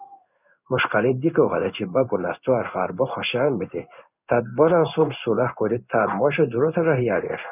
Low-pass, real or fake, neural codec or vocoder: 3.6 kHz; fake; codec, 16 kHz in and 24 kHz out, 1 kbps, XY-Tokenizer